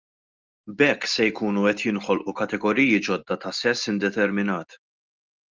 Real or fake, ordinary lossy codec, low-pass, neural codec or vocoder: real; Opus, 24 kbps; 7.2 kHz; none